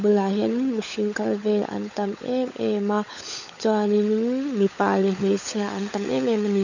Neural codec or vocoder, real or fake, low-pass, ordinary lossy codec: codec, 16 kHz, 16 kbps, FunCodec, trained on LibriTTS, 50 frames a second; fake; 7.2 kHz; none